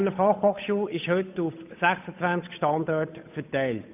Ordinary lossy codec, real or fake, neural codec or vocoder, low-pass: AAC, 32 kbps; fake; codec, 16 kHz, 8 kbps, FunCodec, trained on Chinese and English, 25 frames a second; 3.6 kHz